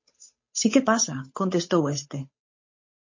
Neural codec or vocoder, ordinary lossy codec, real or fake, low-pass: codec, 16 kHz, 8 kbps, FunCodec, trained on Chinese and English, 25 frames a second; MP3, 32 kbps; fake; 7.2 kHz